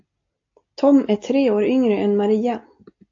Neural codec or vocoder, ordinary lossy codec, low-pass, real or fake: none; AAC, 48 kbps; 7.2 kHz; real